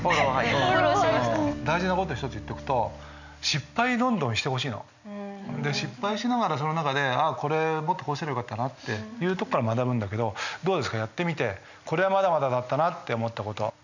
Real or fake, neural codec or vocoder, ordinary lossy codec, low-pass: real; none; none; 7.2 kHz